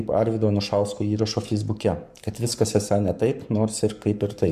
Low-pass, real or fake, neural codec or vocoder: 14.4 kHz; fake; codec, 44.1 kHz, 7.8 kbps, Pupu-Codec